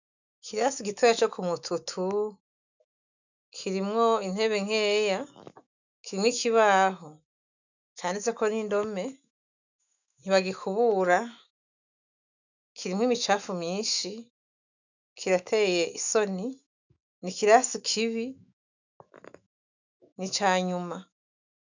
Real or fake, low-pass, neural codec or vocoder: fake; 7.2 kHz; autoencoder, 48 kHz, 128 numbers a frame, DAC-VAE, trained on Japanese speech